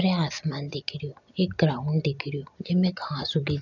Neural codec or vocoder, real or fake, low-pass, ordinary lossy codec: none; real; 7.2 kHz; none